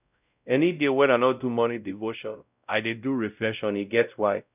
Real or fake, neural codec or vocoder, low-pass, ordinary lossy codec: fake; codec, 16 kHz, 0.5 kbps, X-Codec, WavLM features, trained on Multilingual LibriSpeech; 3.6 kHz; none